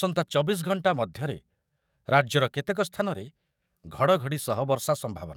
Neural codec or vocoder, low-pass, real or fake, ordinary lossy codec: codec, 44.1 kHz, 7.8 kbps, DAC; 19.8 kHz; fake; none